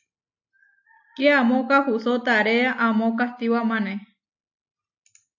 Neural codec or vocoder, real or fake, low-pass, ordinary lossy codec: none; real; 7.2 kHz; AAC, 48 kbps